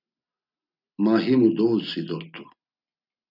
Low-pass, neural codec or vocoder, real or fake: 5.4 kHz; none; real